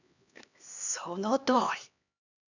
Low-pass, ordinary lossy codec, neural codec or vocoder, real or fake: 7.2 kHz; none; codec, 16 kHz, 2 kbps, X-Codec, HuBERT features, trained on LibriSpeech; fake